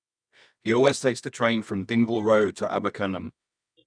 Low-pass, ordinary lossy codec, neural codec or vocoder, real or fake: 9.9 kHz; none; codec, 24 kHz, 0.9 kbps, WavTokenizer, medium music audio release; fake